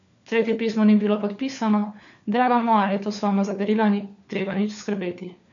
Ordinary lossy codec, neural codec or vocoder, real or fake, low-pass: none; codec, 16 kHz, 4 kbps, FunCodec, trained on LibriTTS, 50 frames a second; fake; 7.2 kHz